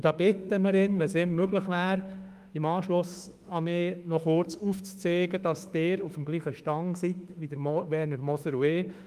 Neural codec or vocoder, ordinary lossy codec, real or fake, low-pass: autoencoder, 48 kHz, 32 numbers a frame, DAC-VAE, trained on Japanese speech; Opus, 32 kbps; fake; 14.4 kHz